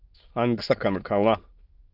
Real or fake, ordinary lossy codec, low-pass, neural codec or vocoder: fake; Opus, 24 kbps; 5.4 kHz; autoencoder, 22.05 kHz, a latent of 192 numbers a frame, VITS, trained on many speakers